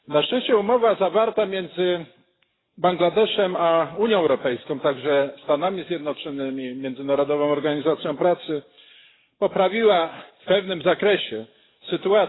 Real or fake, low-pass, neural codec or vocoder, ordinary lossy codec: fake; 7.2 kHz; codec, 44.1 kHz, 7.8 kbps, DAC; AAC, 16 kbps